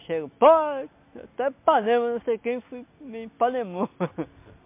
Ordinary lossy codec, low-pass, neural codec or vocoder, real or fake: MP3, 24 kbps; 3.6 kHz; none; real